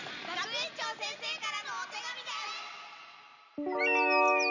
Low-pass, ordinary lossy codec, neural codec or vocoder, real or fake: 7.2 kHz; none; none; real